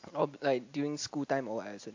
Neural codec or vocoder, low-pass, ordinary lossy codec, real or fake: none; 7.2 kHz; AAC, 48 kbps; real